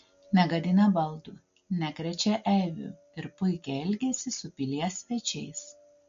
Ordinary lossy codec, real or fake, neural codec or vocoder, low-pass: MP3, 48 kbps; real; none; 7.2 kHz